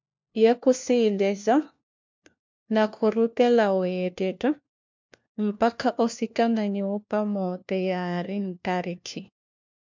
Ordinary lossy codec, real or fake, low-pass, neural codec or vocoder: MP3, 64 kbps; fake; 7.2 kHz; codec, 16 kHz, 1 kbps, FunCodec, trained on LibriTTS, 50 frames a second